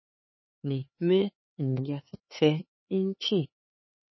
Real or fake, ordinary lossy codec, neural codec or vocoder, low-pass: fake; MP3, 24 kbps; codec, 16 kHz, 4 kbps, X-Codec, HuBERT features, trained on LibriSpeech; 7.2 kHz